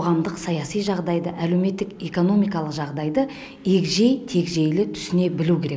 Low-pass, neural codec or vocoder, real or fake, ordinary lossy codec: none; none; real; none